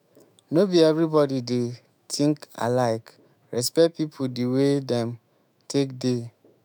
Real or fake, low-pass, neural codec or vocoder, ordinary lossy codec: fake; none; autoencoder, 48 kHz, 128 numbers a frame, DAC-VAE, trained on Japanese speech; none